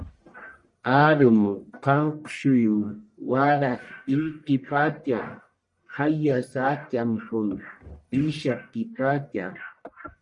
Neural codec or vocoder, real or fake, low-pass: codec, 44.1 kHz, 1.7 kbps, Pupu-Codec; fake; 10.8 kHz